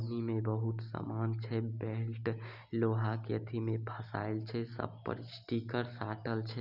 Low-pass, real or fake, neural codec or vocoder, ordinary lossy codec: 5.4 kHz; real; none; none